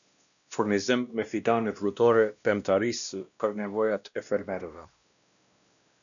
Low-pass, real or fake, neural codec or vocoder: 7.2 kHz; fake; codec, 16 kHz, 1 kbps, X-Codec, WavLM features, trained on Multilingual LibriSpeech